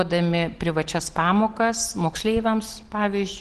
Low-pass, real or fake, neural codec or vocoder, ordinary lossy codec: 10.8 kHz; real; none; Opus, 16 kbps